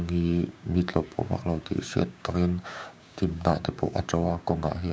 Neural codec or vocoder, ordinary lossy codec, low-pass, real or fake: codec, 16 kHz, 6 kbps, DAC; none; none; fake